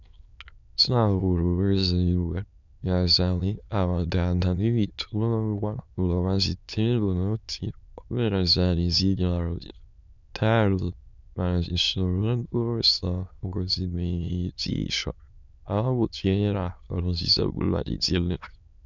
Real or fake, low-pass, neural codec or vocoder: fake; 7.2 kHz; autoencoder, 22.05 kHz, a latent of 192 numbers a frame, VITS, trained on many speakers